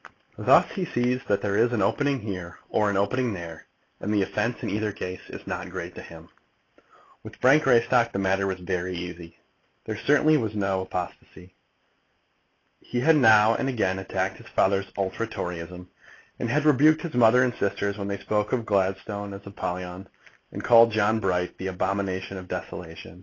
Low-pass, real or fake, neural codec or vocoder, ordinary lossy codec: 7.2 kHz; real; none; AAC, 32 kbps